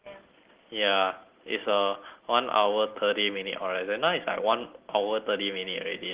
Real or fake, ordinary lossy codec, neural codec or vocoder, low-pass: real; Opus, 16 kbps; none; 3.6 kHz